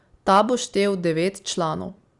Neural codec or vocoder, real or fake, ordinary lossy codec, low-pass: none; real; Opus, 64 kbps; 10.8 kHz